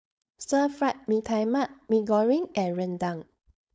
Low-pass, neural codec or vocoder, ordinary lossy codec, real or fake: none; codec, 16 kHz, 4.8 kbps, FACodec; none; fake